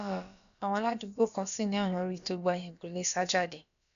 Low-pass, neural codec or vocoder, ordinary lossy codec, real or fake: 7.2 kHz; codec, 16 kHz, about 1 kbps, DyCAST, with the encoder's durations; none; fake